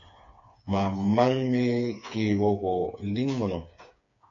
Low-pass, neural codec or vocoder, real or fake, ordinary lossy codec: 7.2 kHz; codec, 16 kHz, 4 kbps, FreqCodec, smaller model; fake; MP3, 48 kbps